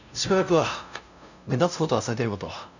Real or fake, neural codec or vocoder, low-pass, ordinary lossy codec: fake; codec, 16 kHz, 0.5 kbps, FunCodec, trained on LibriTTS, 25 frames a second; 7.2 kHz; none